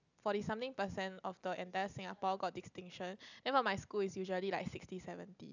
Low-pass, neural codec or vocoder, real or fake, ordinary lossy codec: 7.2 kHz; none; real; none